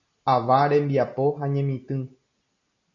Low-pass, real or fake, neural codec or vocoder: 7.2 kHz; real; none